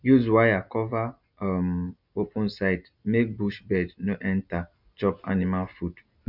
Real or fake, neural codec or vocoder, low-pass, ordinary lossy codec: real; none; 5.4 kHz; none